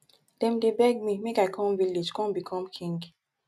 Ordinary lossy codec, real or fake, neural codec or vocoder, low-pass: none; real; none; 14.4 kHz